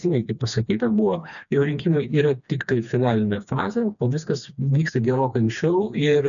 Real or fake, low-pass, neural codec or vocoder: fake; 7.2 kHz; codec, 16 kHz, 2 kbps, FreqCodec, smaller model